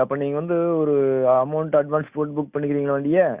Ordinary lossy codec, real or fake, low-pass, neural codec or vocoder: Opus, 64 kbps; real; 3.6 kHz; none